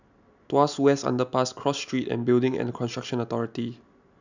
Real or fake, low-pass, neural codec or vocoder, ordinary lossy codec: real; 7.2 kHz; none; none